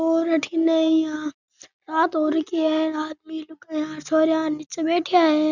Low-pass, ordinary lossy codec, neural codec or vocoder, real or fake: 7.2 kHz; none; none; real